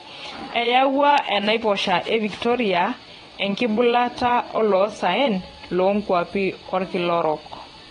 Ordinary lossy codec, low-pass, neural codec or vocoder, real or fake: AAC, 32 kbps; 9.9 kHz; vocoder, 22.05 kHz, 80 mel bands, WaveNeXt; fake